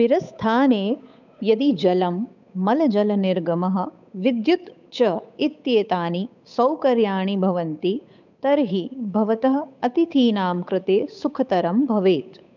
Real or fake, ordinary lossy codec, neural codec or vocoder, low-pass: fake; none; codec, 16 kHz, 8 kbps, FunCodec, trained on Chinese and English, 25 frames a second; 7.2 kHz